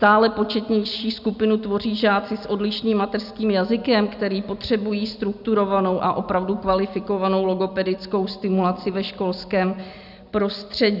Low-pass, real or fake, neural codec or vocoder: 5.4 kHz; real; none